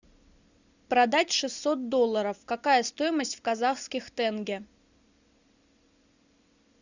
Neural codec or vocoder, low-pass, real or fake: none; 7.2 kHz; real